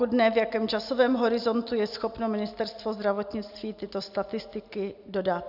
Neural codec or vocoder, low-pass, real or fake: none; 5.4 kHz; real